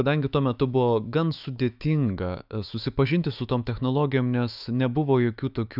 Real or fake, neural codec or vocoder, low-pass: fake; autoencoder, 48 kHz, 128 numbers a frame, DAC-VAE, trained on Japanese speech; 5.4 kHz